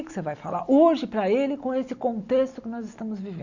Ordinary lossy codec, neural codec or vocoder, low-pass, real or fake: Opus, 64 kbps; none; 7.2 kHz; real